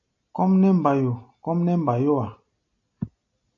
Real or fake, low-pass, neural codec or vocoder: real; 7.2 kHz; none